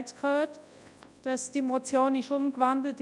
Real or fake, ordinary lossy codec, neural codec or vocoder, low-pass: fake; none; codec, 24 kHz, 0.9 kbps, WavTokenizer, large speech release; 10.8 kHz